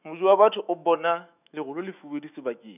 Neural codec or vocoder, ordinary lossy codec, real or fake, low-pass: autoencoder, 48 kHz, 128 numbers a frame, DAC-VAE, trained on Japanese speech; none; fake; 3.6 kHz